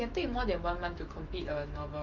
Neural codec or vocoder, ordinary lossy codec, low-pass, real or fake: autoencoder, 48 kHz, 128 numbers a frame, DAC-VAE, trained on Japanese speech; Opus, 24 kbps; 7.2 kHz; fake